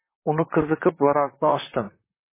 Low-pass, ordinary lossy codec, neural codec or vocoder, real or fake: 3.6 kHz; MP3, 16 kbps; vocoder, 44.1 kHz, 128 mel bands, Pupu-Vocoder; fake